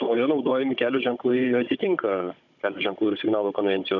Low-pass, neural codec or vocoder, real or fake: 7.2 kHz; codec, 16 kHz, 16 kbps, FunCodec, trained on Chinese and English, 50 frames a second; fake